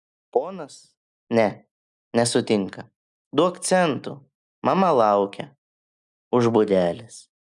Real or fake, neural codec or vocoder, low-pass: real; none; 10.8 kHz